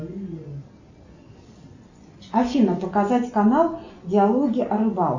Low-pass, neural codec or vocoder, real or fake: 7.2 kHz; none; real